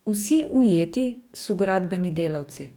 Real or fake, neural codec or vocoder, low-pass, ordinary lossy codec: fake; codec, 44.1 kHz, 2.6 kbps, DAC; 19.8 kHz; none